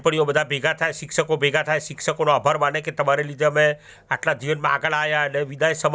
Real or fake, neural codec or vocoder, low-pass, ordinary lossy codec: real; none; none; none